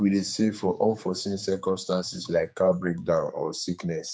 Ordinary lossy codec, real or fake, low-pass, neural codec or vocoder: none; fake; none; codec, 16 kHz, 4 kbps, X-Codec, HuBERT features, trained on general audio